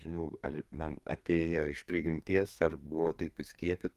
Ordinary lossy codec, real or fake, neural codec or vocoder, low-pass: Opus, 32 kbps; fake; codec, 32 kHz, 1.9 kbps, SNAC; 14.4 kHz